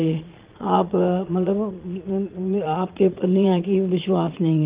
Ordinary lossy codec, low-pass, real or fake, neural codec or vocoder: Opus, 32 kbps; 3.6 kHz; fake; vocoder, 22.05 kHz, 80 mel bands, Vocos